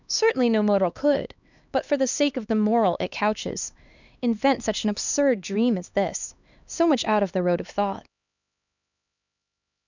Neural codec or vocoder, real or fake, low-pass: codec, 16 kHz, 2 kbps, X-Codec, HuBERT features, trained on LibriSpeech; fake; 7.2 kHz